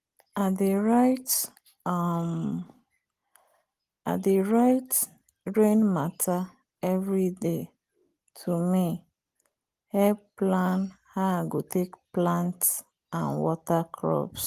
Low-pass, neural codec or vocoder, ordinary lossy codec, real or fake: 14.4 kHz; none; Opus, 24 kbps; real